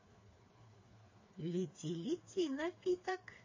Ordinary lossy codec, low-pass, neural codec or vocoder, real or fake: MP3, 32 kbps; 7.2 kHz; codec, 16 kHz, 4 kbps, FreqCodec, smaller model; fake